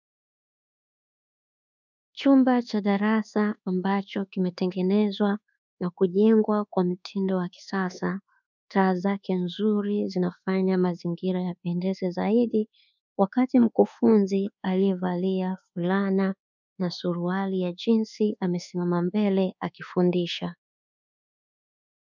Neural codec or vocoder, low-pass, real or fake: codec, 24 kHz, 1.2 kbps, DualCodec; 7.2 kHz; fake